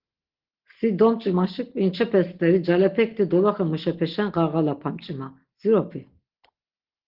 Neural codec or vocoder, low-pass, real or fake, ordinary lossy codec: none; 5.4 kHz; real; Opus, 16 kbps